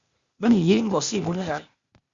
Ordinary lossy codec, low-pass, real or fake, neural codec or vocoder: Opus, 64 kbps; 7.2 kHz; fake; codec, 16 kHz, 0.8 kbps, ZipCodec